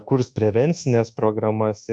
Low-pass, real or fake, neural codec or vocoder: 9.9 kHz; fake; codec, 24 kHz, 1.2 kbps, DualCodec